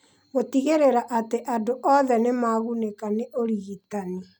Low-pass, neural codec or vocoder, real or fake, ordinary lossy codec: none; none; real; none